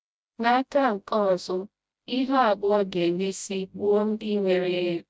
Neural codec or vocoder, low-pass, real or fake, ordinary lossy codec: codec, 16 kHz, 0.5 kbps, FreqCodec, smaller model; none; fake; none